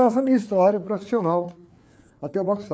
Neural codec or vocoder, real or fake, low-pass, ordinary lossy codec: codec, 16 kHz, 4 kbps, FreqCodec, larger model; fake; none; none